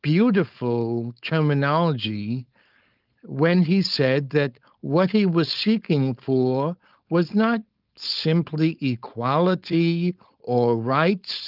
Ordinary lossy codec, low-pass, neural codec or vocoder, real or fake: Opus, 24 kbps; 5.4 kHz; codec, 16 kHz, 4.8 kbps, FACodec; fake